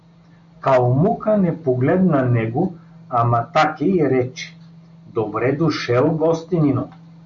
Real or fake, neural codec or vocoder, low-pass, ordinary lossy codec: real; none; 7.2 kHz; MP3, 96 kbps